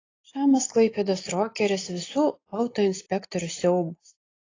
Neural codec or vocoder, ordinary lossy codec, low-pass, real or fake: none; AAC, 32 kbps; 7.2 kHz; real